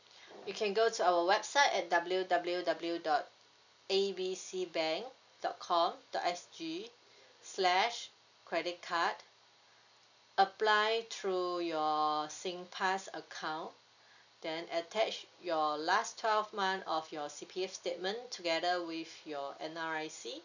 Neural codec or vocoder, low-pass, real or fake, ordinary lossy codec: none; 7.2 kHz; real; none